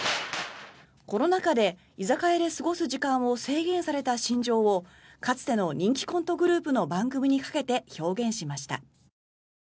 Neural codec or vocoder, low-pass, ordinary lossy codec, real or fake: none; none; none; real